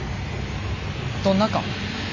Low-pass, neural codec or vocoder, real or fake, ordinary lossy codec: 7.2 kHz; none; real; MP3, 32 kbps